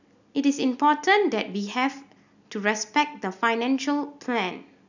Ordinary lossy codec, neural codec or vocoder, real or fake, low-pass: none; none; real; 7.2 kHz